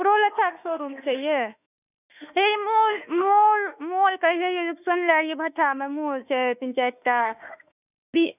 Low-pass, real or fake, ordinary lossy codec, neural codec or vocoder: 3.6 kHz; fake; none; autoencoder, 48 kHz, 32 numbers a frame, DAC-VAE, trained on Japanese speech